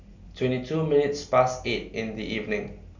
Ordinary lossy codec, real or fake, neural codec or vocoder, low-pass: none; real; none; 7.2 kHz